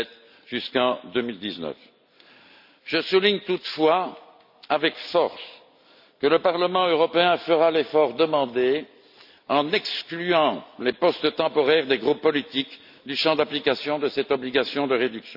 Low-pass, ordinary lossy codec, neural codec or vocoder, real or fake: 5.4 kHz; none; none; real